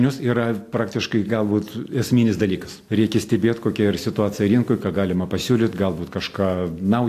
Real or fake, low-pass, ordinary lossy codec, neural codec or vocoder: real; 14.4 kHz; AAC, 64 kbps; none